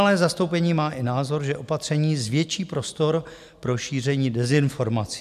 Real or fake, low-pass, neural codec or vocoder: real; 14.4 kHz; none